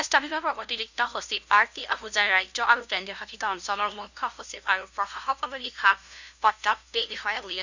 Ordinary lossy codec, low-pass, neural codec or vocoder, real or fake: none; 7.2 kHz; codec, 16 kHz, 0.5 kbps, FunCodec, trained on LibriTTS, 25 frames a second; fake